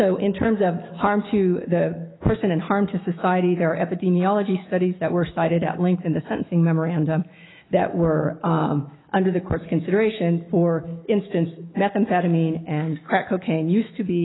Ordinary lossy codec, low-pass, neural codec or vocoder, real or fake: AAC, 16 kbps; 7.2 kHz; autoencoder, 48 kHz, 128 numbers a frame, DAC-VAE, trained on Japanese speech; fake